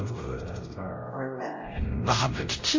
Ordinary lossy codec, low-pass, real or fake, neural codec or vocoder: MP3, 32 kbps; 7.2 kHz; fake; codec, 16 kHz, 0.5 kbps, X-Codec, WavLM features, trained on Multilingual LibriSpeech